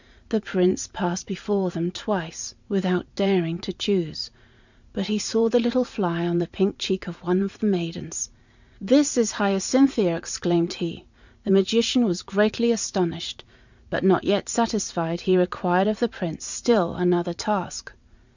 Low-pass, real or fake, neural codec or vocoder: 7.2 kHz; fake; vocoder, 44.1 kHz, 80 mel bands, Vocos